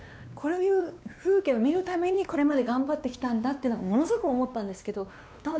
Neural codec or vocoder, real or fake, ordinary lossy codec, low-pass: codec, 16 kHz, 2 kbps, X-Codec, WavLM features, trained on Multilingual LibriSpeech; fake; none; none